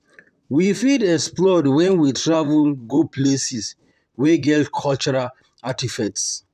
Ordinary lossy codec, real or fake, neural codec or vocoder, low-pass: none; fake; vocoder, 44.1 kHz, 128 mel bands, Pupu-Vocoder; 14.4 kHz